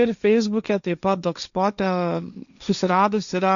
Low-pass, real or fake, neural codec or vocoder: 7.2 kHz; fake; codec, 16 kHz, 1.1 kbps, Voila-Tokenizer